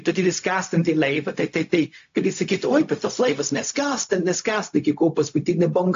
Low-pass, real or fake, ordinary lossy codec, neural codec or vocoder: 7.2 kHz; fake; AAC, 48 kbps; codec, 16 kHz, 0.4 kbps, LongCat-Audio-Codec